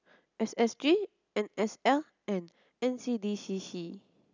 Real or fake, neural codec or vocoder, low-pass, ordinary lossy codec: real; none; 7.2 kHz; none